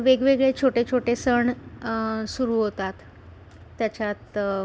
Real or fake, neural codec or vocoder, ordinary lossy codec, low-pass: real; none; none; none